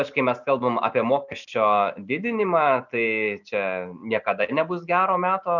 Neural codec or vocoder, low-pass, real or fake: none; 7.2 kHz; real